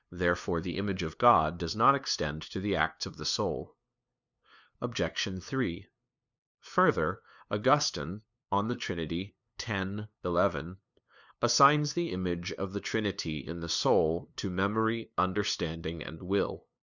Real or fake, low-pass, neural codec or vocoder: fake; 7.2 kHz; codec, 16 kHz, 2 kbps, FunCodec, trained on LibriTTS, 25 frames a second